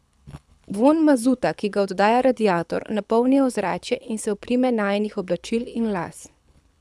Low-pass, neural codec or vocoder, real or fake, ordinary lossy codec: none; codec, 24 kHz, 6 kbps, HILCodec; fake; none